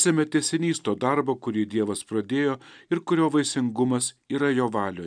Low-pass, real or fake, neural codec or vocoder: 9.9 kHz; real; none